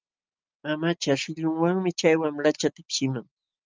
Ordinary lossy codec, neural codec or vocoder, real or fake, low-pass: Opus, 24 kbps; none; real; 7.2 kHz